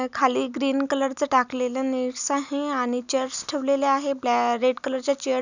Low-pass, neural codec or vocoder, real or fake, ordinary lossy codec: 7.2 kHz; none; real; none